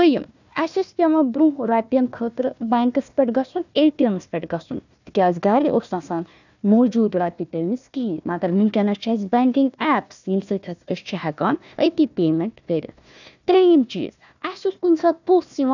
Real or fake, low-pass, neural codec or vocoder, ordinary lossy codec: fake; 7.2 kHz; codec, 16 kHz, 1 kbps, FunCodec, trained on Chinese and English, 50 frames a second; none